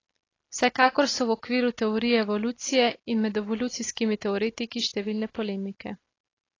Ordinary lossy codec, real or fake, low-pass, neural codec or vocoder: AAC, 32 kbps; real; 7.2 kHz; none